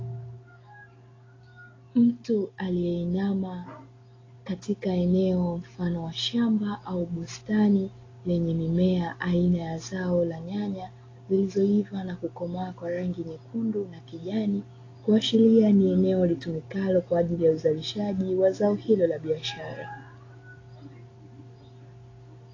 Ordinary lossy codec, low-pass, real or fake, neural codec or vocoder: AAC, 32 kbps; 7.2 kHz; real; none